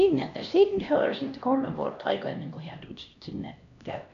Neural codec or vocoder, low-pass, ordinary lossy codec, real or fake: codec, 16 kHz, 1 kbps, X-Codec, HuBERT features, trained on LibriSpeech; 7.2 kHz; none; fake